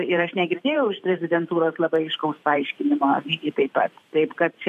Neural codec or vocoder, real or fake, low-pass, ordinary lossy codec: vocoder, 44.1 kHz, 128 mel bands every 512 samples, BigVGAN v2; fake; 14.4 kHz; AAC, 96 kbps